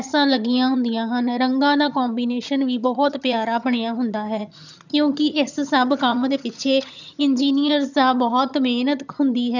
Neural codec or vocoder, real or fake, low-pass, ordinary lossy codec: vocoder, 22.05 kHz, 80 mel bands, HiFi-GAN; fake; 7.2 kHz; none